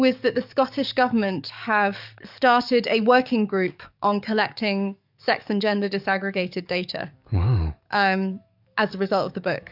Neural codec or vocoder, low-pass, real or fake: codec, 44.1 kHz, 7.8 kbps, DAC; 5.4 kHz; fake